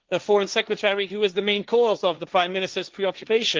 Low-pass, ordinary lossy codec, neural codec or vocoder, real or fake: 7.2 kHz; Opus, 32 kbps; codec, 16 kHz, 1.1 kbps, Voila-Tokenizer; fake